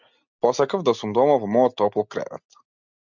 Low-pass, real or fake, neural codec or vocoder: 7.2 kHz; real; none